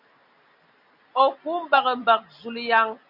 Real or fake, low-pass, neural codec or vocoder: real; 5.4 kHz; none